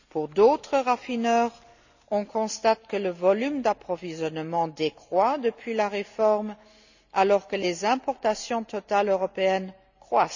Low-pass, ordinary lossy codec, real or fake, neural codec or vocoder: 7.2 kHz; none; real; none